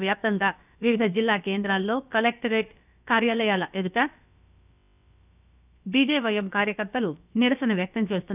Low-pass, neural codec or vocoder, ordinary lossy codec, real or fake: 3.6 kHz; codec, 16 kHz, about 1 kbps, DyCAST, with the encoder's durations; none; fake